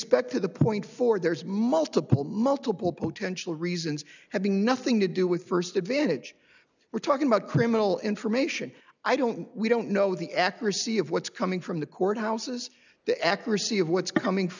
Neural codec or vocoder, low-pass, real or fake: none; 7.2 kHz; real